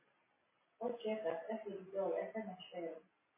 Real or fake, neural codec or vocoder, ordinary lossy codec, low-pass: real; none; MP3, 16 kbps; 3.6 kHz